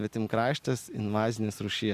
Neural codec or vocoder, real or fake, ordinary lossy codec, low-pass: none; real; Opus, 64 kbps; 14.4 kHz